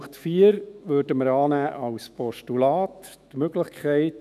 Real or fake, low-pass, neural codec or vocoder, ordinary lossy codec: fake; 14.4 kHz; autoencoder, 48 kHz, 128 numbers a frame, DAC-VAE, trained on Japanese speech; none